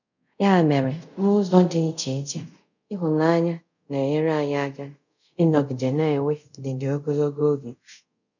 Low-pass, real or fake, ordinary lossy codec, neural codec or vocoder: 7.2 kHz; fake; none; codec, 24 kHz, 0.5 kbps, DualCodec